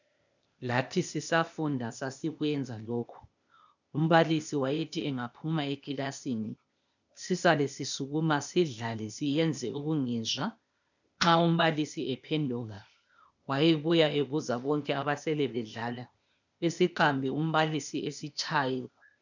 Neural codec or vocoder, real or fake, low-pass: codec, 16 kHz, 0.8 kbps, ZipCodec; fake; 7.2 kHz